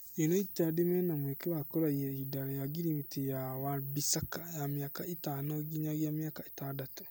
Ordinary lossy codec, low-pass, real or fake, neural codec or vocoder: none; none; real; none